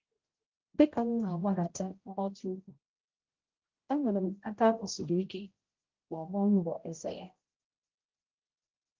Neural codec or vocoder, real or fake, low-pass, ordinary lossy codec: codec, 16 kHz, 0.5 kbps, X-Codec, HuBERT features, trained on general audio; fake; 7.2 kHz; Opus, 32 kbps